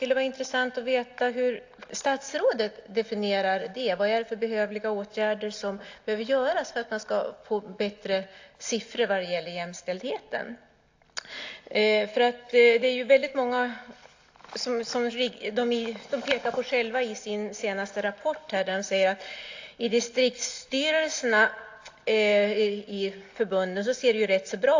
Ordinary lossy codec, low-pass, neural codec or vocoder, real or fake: AAC, 48 kbps; 7.2 kHz; none; real